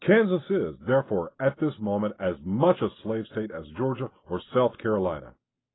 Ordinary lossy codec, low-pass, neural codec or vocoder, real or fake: AAC, 16 kbps; 7.2 kHz; none; real